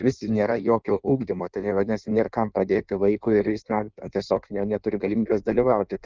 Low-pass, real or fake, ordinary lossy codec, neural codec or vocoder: 7.2 kHz; fake; Opus, 24 kbps; codec, 16 kHz in and 24 kHz out, 1.1 kbps, FireRedTTS-2 codec